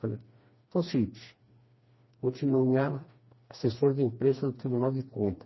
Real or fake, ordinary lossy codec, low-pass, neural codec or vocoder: fake; MP3, 24 kbps; 7.2 kHz; codec, 16 kHz, 2 kbps, FreqCodec, smaller model